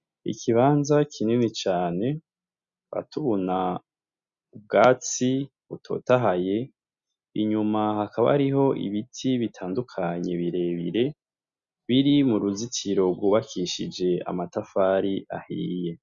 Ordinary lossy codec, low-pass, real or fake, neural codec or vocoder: Opus, 64 kbps; 7.2 kHz; real; none